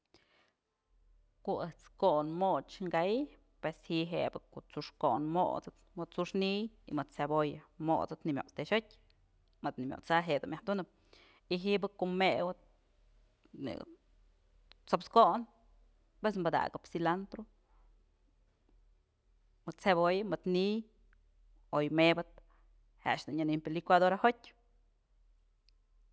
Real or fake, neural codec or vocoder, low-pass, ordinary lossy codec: real; none; none; none